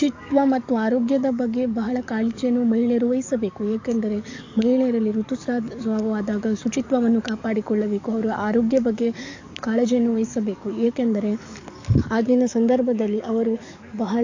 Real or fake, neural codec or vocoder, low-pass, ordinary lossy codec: fake; codec, 44.1 kHz, 7.8 kbps, DAC; 7.2 kHz; AAC, 48 kbps